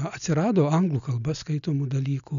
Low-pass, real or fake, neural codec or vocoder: 7.2 kHz; real; none